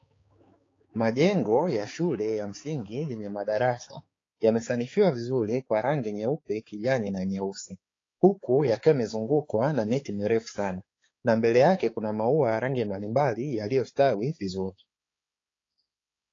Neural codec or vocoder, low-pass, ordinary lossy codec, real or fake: codec, 16 kHz, 4 kbps, X-Codec, HuBERT features, trained on balanced general audio; 7.2 kHz; AAC, 32 kbps; fake